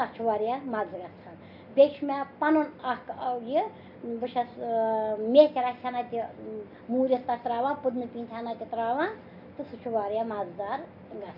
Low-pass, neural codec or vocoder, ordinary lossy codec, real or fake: 5.4 kHz; none; none; real